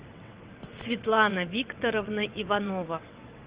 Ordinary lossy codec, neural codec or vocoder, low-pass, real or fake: Opus, 24 kbps; vocoder, 22.05 kHz, 80 mel bands, WaveNeXt; 3.6 kHz; fake